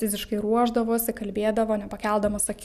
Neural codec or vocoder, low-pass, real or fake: none; 14.4 kHz; real